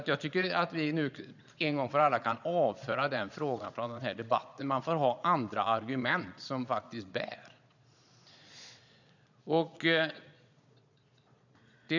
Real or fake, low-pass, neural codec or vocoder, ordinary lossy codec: fake; 7.2 kHz; vocoder, 22.05 kHz, 80 mel bands, Vocos; none